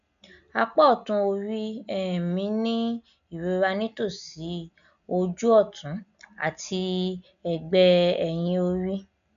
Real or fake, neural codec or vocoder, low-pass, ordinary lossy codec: real; none; 7.2 kHz; none